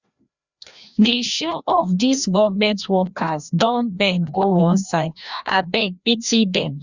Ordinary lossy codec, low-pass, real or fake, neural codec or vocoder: Opus, 64 kbps; 7.2 kHz; fake; codec, 16 kHz, 1 kbps, FreqCodec, larger model